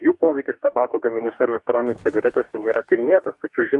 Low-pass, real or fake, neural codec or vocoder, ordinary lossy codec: 10.8 kHz; fake; codec, 44.1 kHz, 2.6 kbps, DAC; Opus, 64 kbps